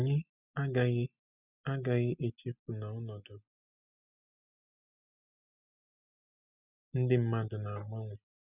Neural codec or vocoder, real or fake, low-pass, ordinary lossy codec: none; real; 3.6 kHz; none